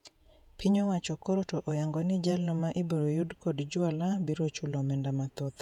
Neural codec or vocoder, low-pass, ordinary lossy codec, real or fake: vocoder, 48 kHz, 128 mel bands, Vocos; 19.8 kHz; none; fake